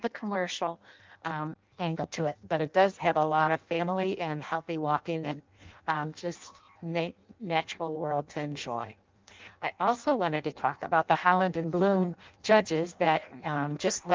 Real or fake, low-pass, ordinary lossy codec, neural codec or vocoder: fake; 7.2 kHz; Opus, 24 kbps; codec, 16 kHz in and 24 kHz out, 0.6 kbps, FireRedTTS-2 codec